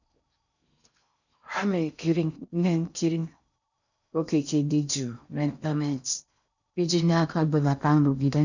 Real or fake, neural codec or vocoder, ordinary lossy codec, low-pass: fake; codec, 16 kHz in and 24 kHz out, 0.6 kbps, FocalCodec, streaming, 4096 codes; none; 7.2 kHz